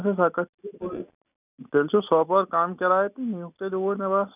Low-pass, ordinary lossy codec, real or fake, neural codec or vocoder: 3.6 kHz; none; real; none